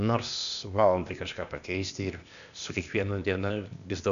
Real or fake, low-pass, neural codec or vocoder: fake; 7.2 kHz; codec, 16 kHz, 0.8 kbps, ZipCodec